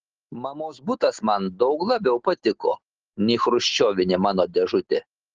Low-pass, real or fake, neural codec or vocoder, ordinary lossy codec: 7.2 kHz; real; none; Opus, 16 kbps